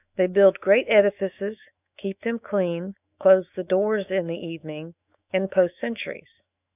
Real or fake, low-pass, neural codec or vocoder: fake; 3.6 kHz; autoencoder, 48 kHz, 128 numbers a frame, DAC-VAE, trained on Japanese speech